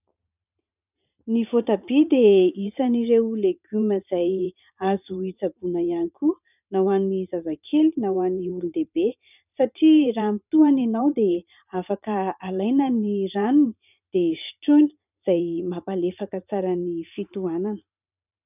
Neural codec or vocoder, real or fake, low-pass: vocoder, 44.1 kHz, 128 mel bands every 512 samples, BigVGAN v2; fake; 3.6 kHz